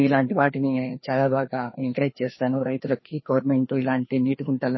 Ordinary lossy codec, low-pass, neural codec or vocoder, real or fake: MP3, 24 kbps; 7.2 kHz; codec, 16 kHz, 2 kbps, FreqCodec, larger model; fake